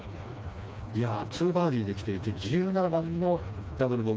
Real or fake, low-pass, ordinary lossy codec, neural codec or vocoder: fake; none; none; codec, 16 kHz, 2 kbps, FreqCodec, smaller model